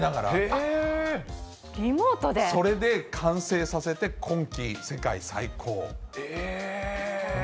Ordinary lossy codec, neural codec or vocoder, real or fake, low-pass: none; none; real; none